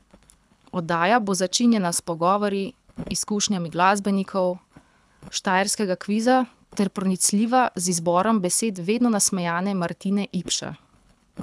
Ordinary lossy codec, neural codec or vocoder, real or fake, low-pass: none; codec, 24 kHz, 6 kbps, HILCodec; fake; none